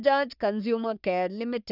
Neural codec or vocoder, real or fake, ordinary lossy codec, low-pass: codec, 44.1 kHz, 3.4 kbps, Pupu-Codec; fake; none; 5.4 kHz